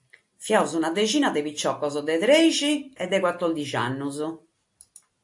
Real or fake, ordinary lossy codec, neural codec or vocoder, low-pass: real; AAC, 64 kbps; none; 10.8 kHz